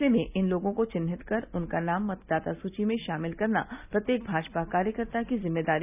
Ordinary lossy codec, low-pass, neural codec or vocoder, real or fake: none; 3.6 kHz; none; real